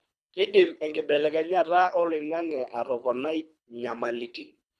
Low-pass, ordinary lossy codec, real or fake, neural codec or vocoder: none; none; fake; codec, 24 kHz, 3 kbps, HILCodec